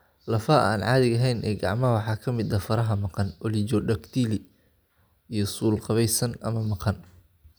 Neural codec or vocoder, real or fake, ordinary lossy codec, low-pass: none; real; none; none